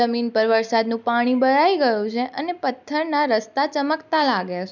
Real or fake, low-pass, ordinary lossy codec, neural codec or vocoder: real; 7.2 kHz; none; none